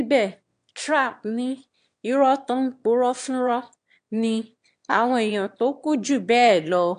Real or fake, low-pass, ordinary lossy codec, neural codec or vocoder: fake; 9.9 kHz; AAC, 64 kbps; autoencoder, 22.05 kHz, a latent of 192 numbers a frame, VITS, trained on one speaker